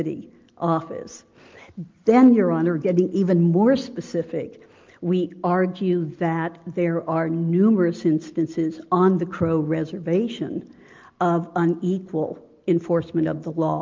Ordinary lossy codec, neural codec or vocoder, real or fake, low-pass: Opus, 32 kbps; none; real; 7.2 kHz